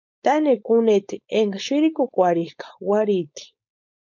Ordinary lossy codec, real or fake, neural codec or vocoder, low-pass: MP3, 64 kbps; fake; codec, 16 kHz, 4.8 kbps, FACodec; 7.2 kHz